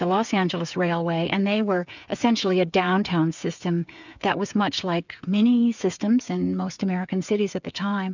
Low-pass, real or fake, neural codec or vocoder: 7.2 kHz; fake; codec, 16 kHz, 8 kbps, FreqCodec, smaller model